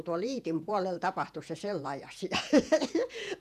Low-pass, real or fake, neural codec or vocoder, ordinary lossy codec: 14.4 kHz; fake; vocoder, 48 kHz, 128 mel bands, Vocos; none